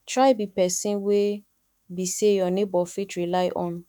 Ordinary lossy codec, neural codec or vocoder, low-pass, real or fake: none; autoencoder, 48 kHz, 128 numbers a frame, DAC-VAE, trained on Japanese speech; 19.8 kHz; fake